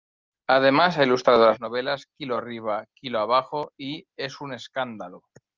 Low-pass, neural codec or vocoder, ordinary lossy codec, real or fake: 7.2 kHz; none; Opus, 24 kbps; real